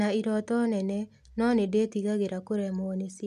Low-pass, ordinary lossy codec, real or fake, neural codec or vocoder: 14.4 kHz; none; real; none